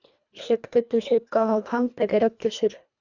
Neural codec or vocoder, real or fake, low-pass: codec, 24 kHz, 1.5 kbps, HILCodec; fake; 7.2 kHz